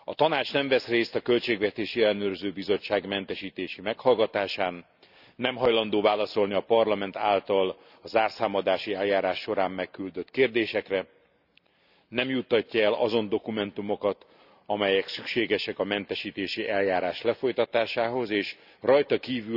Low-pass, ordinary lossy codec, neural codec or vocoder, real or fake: 5.4 kHz; none; none; real